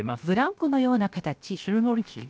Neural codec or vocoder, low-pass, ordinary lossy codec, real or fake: codec, 16 kHz, 0.7 kbps, FocalCodec; none; none; fake